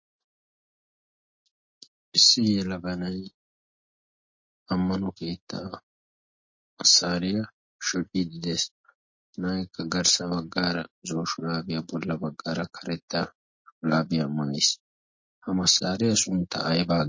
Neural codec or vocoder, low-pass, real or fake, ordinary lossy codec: none; 7.2 kHz; real; MP3, 32 kbps